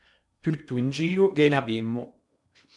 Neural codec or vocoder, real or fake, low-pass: codec, 16 kHz in and 24 kHz out, 0.8 kbps, FocalCodec, streaming, 65536 codes; fake; 10.8 kHz